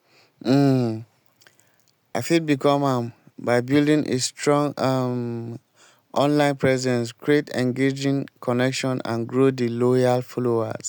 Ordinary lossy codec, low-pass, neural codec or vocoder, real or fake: none; none; none; real